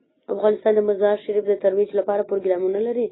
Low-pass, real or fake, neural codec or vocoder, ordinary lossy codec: 7.2 kHz; real; none; AAC, 16 kbps